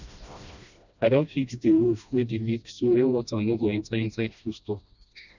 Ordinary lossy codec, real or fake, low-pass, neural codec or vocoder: none; fake; 7.2 kHz; codec, 16 kHz, 1 kbps, FreqCodec, smaller model